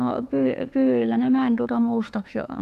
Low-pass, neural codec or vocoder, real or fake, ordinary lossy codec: 14.4 kHz; autoencoder, 48 kHz, 32 numbers a frame, DAC-VAE, trained on Japanese speech; fake; none